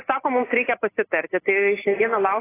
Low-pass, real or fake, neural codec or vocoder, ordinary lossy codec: 3.6 kHz; real; none; AAC, 16 kbps